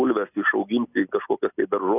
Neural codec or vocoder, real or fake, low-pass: none; real; 3.6 kHz